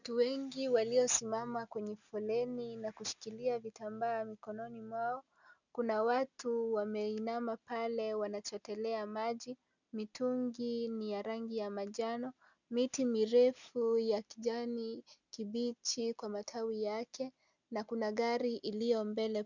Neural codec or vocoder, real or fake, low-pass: none; real; 7.2 kHz